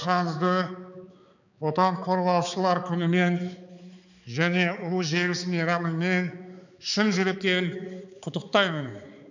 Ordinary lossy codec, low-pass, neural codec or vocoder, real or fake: none; 7.2 kHz; codec, 16 kHz, 4 kbps, X-Codec, HuBERT features, trained on balanced general audio; fake